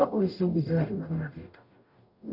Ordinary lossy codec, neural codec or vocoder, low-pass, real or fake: none; codec, 44.1 kHz, 0.9 kbps, DAC; 5.4 kHz; fake